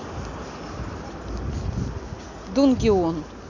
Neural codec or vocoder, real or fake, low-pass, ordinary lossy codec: none; real; 7.2 kHz; none